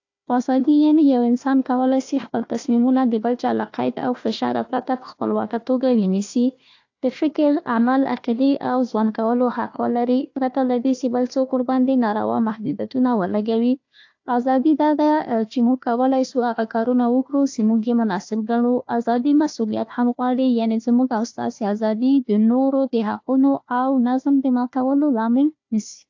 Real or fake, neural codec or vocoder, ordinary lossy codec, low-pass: fake; codec, 16 kHz, 1 kbps, FunCodec, trained on Chinese and English, 50 frames a second; AAC, 48 kbps; 7.2 kHz